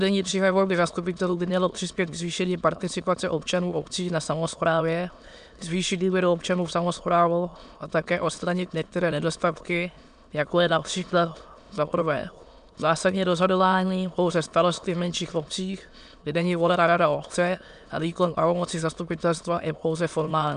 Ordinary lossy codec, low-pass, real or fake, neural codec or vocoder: AAC, 96 kbps; 9.9 kHz; fake; autoencoder, 22.05 kHz, a latent of 192 numbers a frame, VITS, trained on many speakers